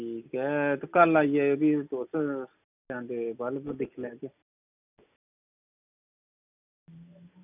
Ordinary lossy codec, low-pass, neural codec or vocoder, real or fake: none; 3.6 kHz; none; real